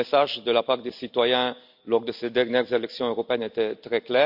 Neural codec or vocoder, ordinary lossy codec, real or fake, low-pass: none; AAC, 48 kbps; real; 5.4 kHz